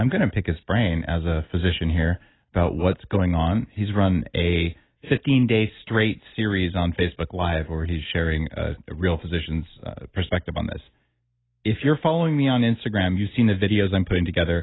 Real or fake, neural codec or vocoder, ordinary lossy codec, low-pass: real; none; AAC, 16 kbps; 7.2 kHz